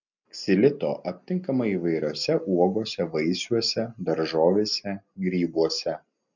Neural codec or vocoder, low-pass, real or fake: none; 7.2 kHz; real